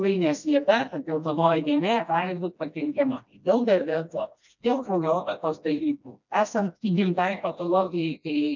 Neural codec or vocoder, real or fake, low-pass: codec, 16 kHz, 1 kbps, FreqCodec, smaller model; fake; 7.2 kHz